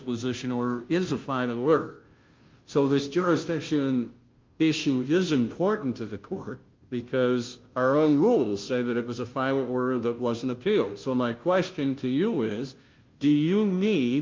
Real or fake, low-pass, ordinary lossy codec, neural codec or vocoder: fake; 7.2 kHz; Opus, 32 kbps; codec, 16 kHz, 0.5 kbps, FunCodec, trained on Chinese and English, 25 frames a second